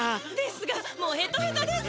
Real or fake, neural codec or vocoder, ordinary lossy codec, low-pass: real; none; none; none